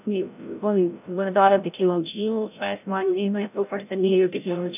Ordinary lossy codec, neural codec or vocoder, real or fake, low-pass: none; codec, 16 kHz, 0.5 kbps, FreqCodec, larger model; fake; 3.6 kHz